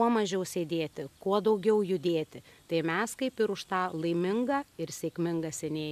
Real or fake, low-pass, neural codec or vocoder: real; 14.4 kHz; none